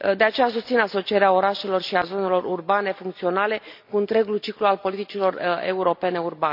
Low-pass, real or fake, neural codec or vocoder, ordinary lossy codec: 5.4 kHz; real; none; none